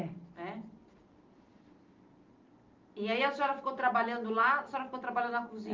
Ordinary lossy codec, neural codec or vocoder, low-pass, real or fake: Opus, 32 kbps; none; 7.2 kHz; real